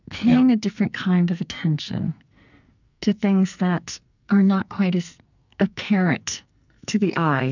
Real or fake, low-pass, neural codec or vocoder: fake; 7.2 kHz; codec, 44.1 kHz, 2.6 kbps, SNAC